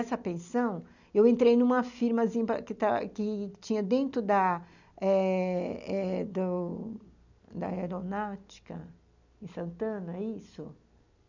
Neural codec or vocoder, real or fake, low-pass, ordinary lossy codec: none; real; 7.2 kHz; none